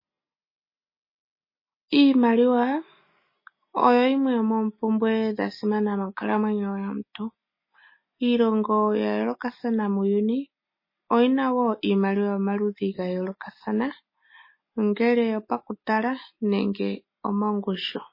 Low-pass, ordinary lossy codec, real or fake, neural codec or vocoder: 5.4 kHz; MP3, 24 kbps; real; none